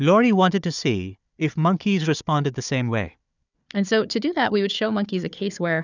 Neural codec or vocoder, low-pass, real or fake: codec, 16 kHz, 4 kbps, FunCodec, trained on Chinese and English, 50 frames a second; 7.2 kHz; fake